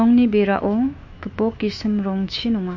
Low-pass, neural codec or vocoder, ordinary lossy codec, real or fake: 7.2 kHz; autoencoder, 48 kHz, 128 numbers a frame, DAC-VAE, trained on Japanese speech; MP3, 48 kbps; fake